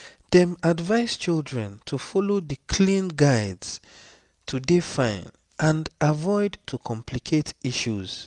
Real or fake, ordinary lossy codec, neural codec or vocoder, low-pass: real; none; none; 10.8 kHz